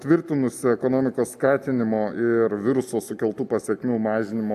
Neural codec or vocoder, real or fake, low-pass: none; real; 14.4 kHz